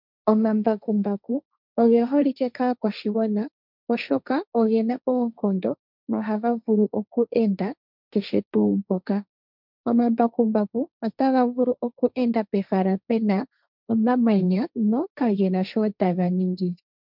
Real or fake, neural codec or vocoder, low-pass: fake; codec, 16 kHz, 1.1 kbps, Voila-Tokenizer; 5.4 kHz